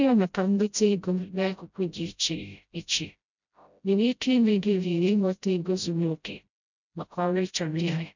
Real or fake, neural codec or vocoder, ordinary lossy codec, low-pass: fake; codec, 16 kHz, 0.5 kbps, FreqCodec, smaller model; none; 7.2 kHz